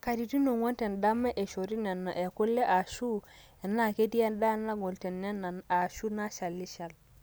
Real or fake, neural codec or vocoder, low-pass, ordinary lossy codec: real; none; none; none